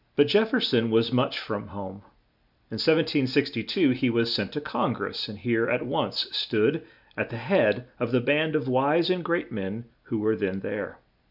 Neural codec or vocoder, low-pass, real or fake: none; 5.4 kHz; real